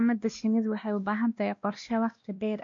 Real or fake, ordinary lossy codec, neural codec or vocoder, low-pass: fake; MP3, 48 kbps; codec, 16 kHz, 1 kbps, X-Codec, WavLM features, trained on Multilingual LibriSpeech; 7.2 kHz